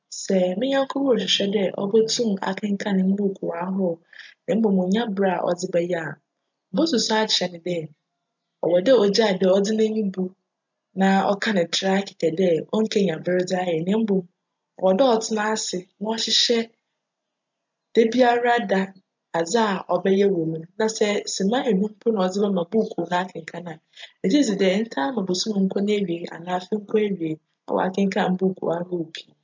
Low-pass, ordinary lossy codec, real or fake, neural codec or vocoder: 7.2 kHz; MP3, 64 kbps; real; none